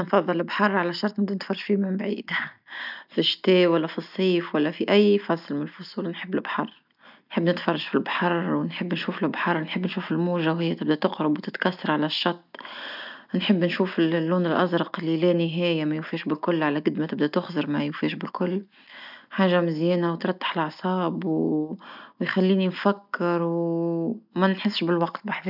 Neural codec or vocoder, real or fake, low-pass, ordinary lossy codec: none; real; 5.4 kHz; none